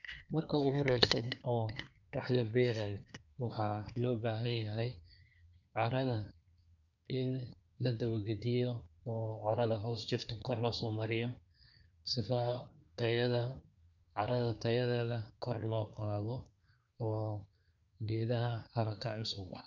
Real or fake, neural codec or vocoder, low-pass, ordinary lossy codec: fake; codec, 24 kHz, 1 kbps, SNAC; 7.2 kHz; none